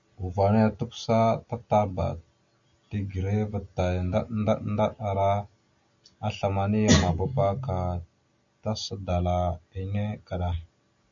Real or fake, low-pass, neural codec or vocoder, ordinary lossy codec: real; 7.2 kHz; none; AAC, 64 kbps